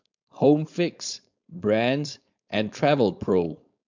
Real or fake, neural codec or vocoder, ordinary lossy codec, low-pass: fake; codec, 16 kHz, 4.8 kbps, FACodec; MP3, 64 kbps; 7.2 kHz